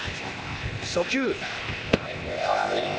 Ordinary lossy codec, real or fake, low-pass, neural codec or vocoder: none; fake; none; codec, 16 kHz, 0.8 kbps, ZipCodec